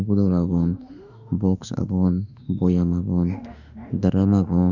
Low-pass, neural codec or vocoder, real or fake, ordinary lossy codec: 7.2 kHz; autoencoder, 48 kHz, 32 numbers a frame, DAC-VAE, trained on Japanese speech; fake; none